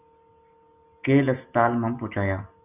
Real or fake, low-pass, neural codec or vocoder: real; 3.6 kHz; none